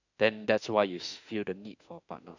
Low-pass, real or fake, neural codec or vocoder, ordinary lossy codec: 7.2 kHz; fake; autoencoder, 48 kHz, 32 numbers a frame, DAC-VAE, trained on Japanese speech; none